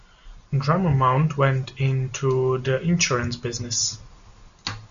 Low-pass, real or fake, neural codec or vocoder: 7.2 kHz; real; none